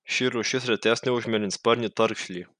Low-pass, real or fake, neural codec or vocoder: 9.9 kHz; real; none